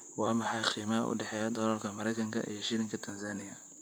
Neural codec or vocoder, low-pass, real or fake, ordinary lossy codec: vocoder, 44.1 kHz, 128 mel bands, Pupu-Vocoder; none; fake; none